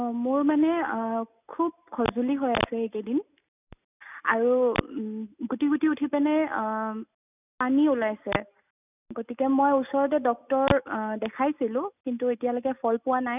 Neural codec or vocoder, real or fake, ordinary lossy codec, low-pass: none; real; none; 3.6 kHz